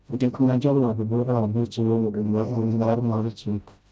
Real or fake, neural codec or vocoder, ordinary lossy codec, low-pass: fake; codec, 16 kHz, 0.5 kbps, FreqCodec, smaller model; none; none